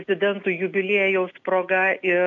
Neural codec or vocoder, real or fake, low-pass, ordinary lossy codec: none; real; 7.2 kHz; MP3, 64 kbps